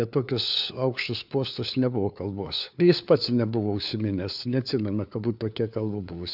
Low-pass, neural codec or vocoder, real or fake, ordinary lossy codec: 5.4 kHz; codec, 16 kHz, 4 kbps, FunCodec, trained on LibriTTS, 50 frames a second; fake; AAC, 48 kbps